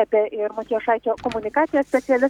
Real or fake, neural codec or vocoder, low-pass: real; none; 19.8 kHz